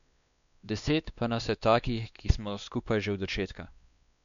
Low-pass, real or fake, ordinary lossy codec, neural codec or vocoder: 7.2 kHz; fake; none; codec, 16 kHz, 2 kbps, X-Codec, WavLM features, trained on Multilingual LibriSpeech